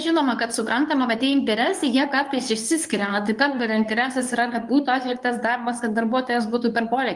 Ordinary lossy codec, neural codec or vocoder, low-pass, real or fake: Opus, 32 kbps; codec, 24 kHz, 0.9 kbps, WavTokenizer, medium speech release version 2; 10.8 kHz; fake